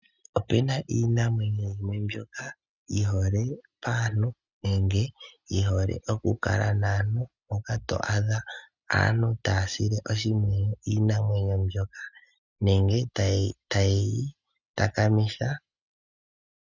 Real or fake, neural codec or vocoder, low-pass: real; none; 7.2 kHz